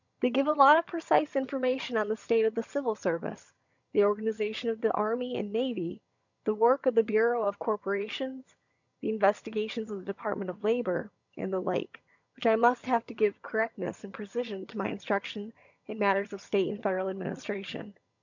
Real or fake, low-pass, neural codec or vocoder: fake; 7.2 kHz; vocoder, 22.05 kHz, 80 mel bands, HiFi-GAN